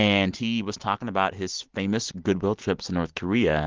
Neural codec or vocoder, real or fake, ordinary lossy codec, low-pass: none; real; Opus, 16 kbps; 7.2 kHz